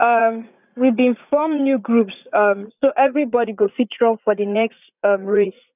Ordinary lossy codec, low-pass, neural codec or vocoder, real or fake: none; 3.6 kHz; vocoder, 44.1 kHz, 80 mel bands, Vocos; fake